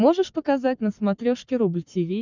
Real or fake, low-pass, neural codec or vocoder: fake; 7.2 kHz; codec, 44.1 kHz, 7.8 kbps, Pupu-Codec